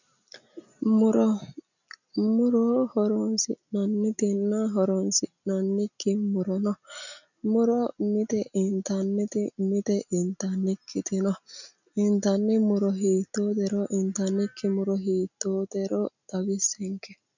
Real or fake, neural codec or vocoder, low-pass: real; none; 7.2 kHz